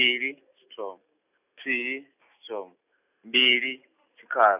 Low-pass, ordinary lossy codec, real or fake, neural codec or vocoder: 3.6 kHz; none; real; none